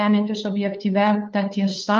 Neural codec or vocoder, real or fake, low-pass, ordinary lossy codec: codec, 16 kHz, 2 kbps, FunCodec, trained on LibriTTS, 25 frames a second; fake; 7.2 kHz; Opus, 24 kbps